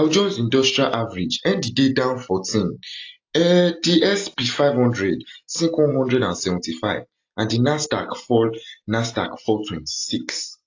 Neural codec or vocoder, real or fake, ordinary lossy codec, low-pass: none; real; AAC, 48 kbps; 7.2 kHz